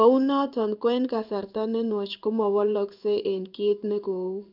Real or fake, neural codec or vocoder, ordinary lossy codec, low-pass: fake; codec, 16 kHz in and 24 kHz out, 1 kbps, XY-Tokenizer; none; 5.4 kHz